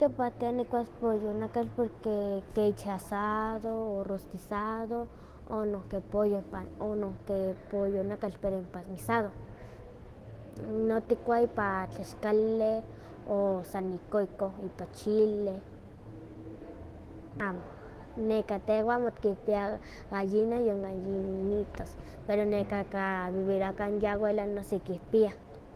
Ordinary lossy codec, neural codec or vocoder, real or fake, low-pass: Opus, 16 kbps; autoencoder, 48 kHz, 128 numbers a frame, DAC-VAE, trained on Japanese speech; fake; 14.4 kHz